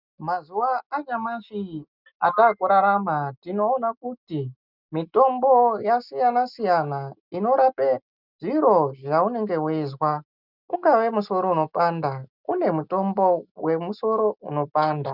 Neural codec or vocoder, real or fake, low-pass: none; real; 5.4 kHz